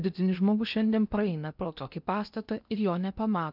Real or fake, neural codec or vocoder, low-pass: fake; codec, 16 kHz in and 24 kHz out, 0.8 kbps, FocalCodec, streaming, 65536 codes; 5.4 kHz